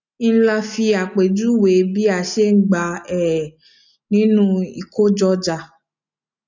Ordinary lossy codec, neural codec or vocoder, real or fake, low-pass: none; none; real; 7.2 kHz